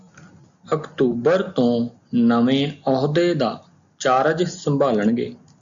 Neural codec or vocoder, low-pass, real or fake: none; 7.2 kHz; real